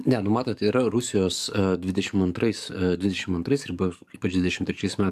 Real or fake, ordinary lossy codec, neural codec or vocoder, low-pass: fake; AAC, 96 kbps; codec, 44.1 kHz, 7.8 kbps, DAC; 14.4 kHz